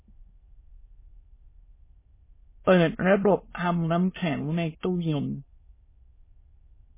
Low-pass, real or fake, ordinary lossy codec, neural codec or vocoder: 3.6 kHz; fake; MP3, 16 kbps; autoencoder, 22.05 kHz, a latent of 192 numbers a frame, VITS, trained on many speakers